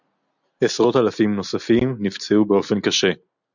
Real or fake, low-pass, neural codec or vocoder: real; 7.2 kHz; none